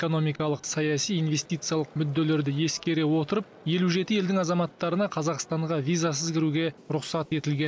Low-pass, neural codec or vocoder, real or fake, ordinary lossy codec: none; none; real; none